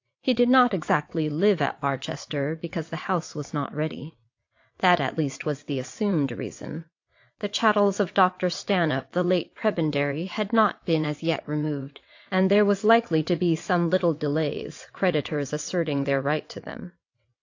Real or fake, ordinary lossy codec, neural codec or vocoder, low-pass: fake; AAC, 48 kbps; vocoder, 22.05 kHz, 80 mel bands, WaveNeXt; 7.2 kHz